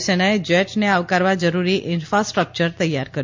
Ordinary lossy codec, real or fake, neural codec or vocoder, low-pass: MP3, 48 kbps; real; none; 7.2 kHz